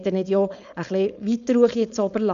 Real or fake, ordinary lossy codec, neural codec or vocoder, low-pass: fake; none; codec, 16 kHz, 4.8 kbps, FACodec; 7.2 kHz